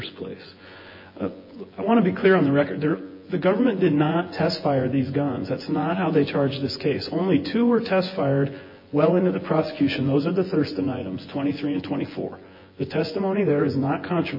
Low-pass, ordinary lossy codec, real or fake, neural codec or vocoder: 5.4 kHz; MP3, 24 kbps; fake; vocoder, 24 kHz, 100 mel bands, Vocos